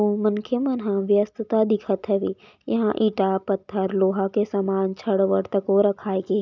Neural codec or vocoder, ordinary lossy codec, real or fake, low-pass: none; none; real; 7.2 kHz